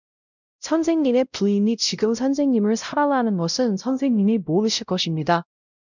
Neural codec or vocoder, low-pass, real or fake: codec, 16 kHz, 0.5 kbps, X-Codec, WavLM features, trained on Multilingual LibriSpeech; 7.2 kHz; fake